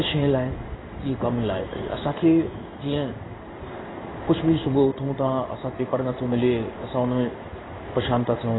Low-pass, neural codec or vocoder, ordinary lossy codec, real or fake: 7.2 kHz; codec, 16 kHz in and 24 kHz out, 1 kbps, XY-Tokenizer; AAC, 16 kbps; fake